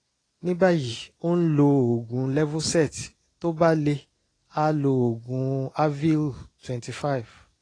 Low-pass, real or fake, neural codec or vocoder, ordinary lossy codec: 9.9 kHz; real; none; AAC, 32 kbps